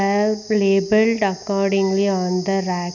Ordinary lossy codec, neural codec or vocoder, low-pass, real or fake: none; none; 7.2 kHz; real